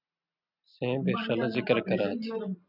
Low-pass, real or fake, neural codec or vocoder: 5.4 kHz; real; none